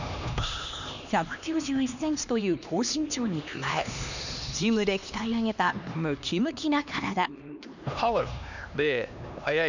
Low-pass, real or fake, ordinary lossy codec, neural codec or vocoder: 7.2 kHz; fake; none; codec, 16 kHz, 2 kbps, X-Codec, HuBERT features, trained on LibriSpeech